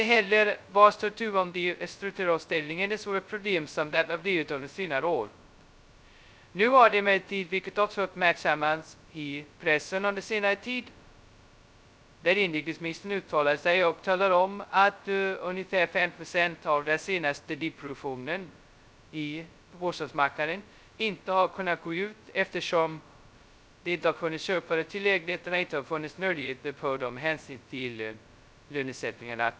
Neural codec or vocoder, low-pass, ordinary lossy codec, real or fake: codec, 16 kHz, 0.2 kbps, FocalCodec; none; none; fake